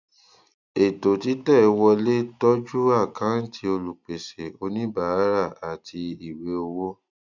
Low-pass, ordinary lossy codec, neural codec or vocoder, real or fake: 7.2 kHz; none; none; real